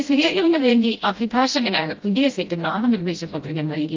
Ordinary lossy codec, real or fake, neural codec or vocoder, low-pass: Opus, 24 kbps; fake; codec, 16 kHz, 0.5 kbps, FreqCodec, smaller model; 7.2 kHz